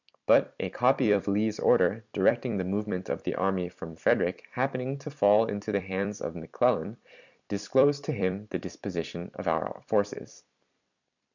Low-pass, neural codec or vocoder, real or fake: 7.2 kHz; vocoder, 44.1 kHz, 128 mel bands every 256 samples, BigVGAN v2; fake